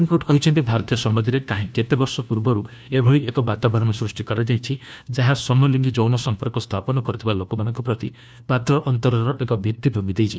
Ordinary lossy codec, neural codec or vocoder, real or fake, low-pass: none; codec, 16 kHz, 1 kbps, FunCodec, trained on LibriTTS, 50 frames a second; fake; none